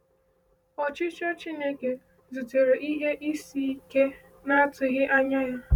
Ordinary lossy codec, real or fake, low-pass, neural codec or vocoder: none; fake; 19.8 kHz; vocoder, 44.1 kHz, 128 mel bands every 512 samples, BigVGAN v2